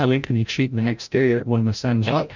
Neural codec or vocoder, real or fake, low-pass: codec, 16 kHz, 0.5 kbps, FreqCodec, larger model; fake; 7.2 kHz